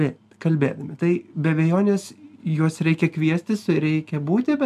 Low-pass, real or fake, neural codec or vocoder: 14.4 kHz; real; none